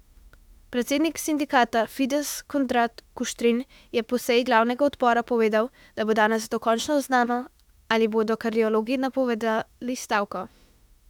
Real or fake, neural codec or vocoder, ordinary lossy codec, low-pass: fake; autoencoder, 48 kHz, 32 numbers a frame, DAC-VAE, trained on Japanese speech; none; 19.8 kHz